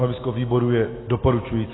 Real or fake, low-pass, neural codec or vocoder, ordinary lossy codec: real; 7.2 kHz; none; AAC, 16 kbps